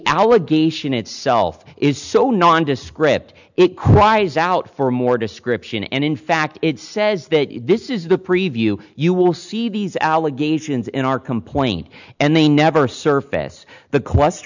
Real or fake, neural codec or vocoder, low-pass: real; none; 7.2 kHz